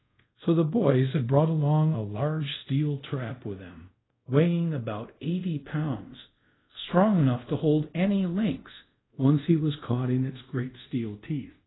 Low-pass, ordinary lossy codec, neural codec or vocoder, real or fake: 7.2 kHz; AAC, 16 kbps; codec, 24 kHz, 0.5 kbps, DualCodec; fake